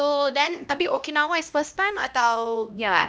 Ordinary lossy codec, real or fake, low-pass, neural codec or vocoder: none; fake; none; codec, 16 kHz, 1 kbps, X-Codec, HuBERT features, trained on LibriSpeech